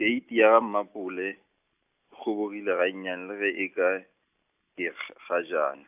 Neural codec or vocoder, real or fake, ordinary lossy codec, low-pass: none; real; AAC, 32 kbps; 3.6 kHz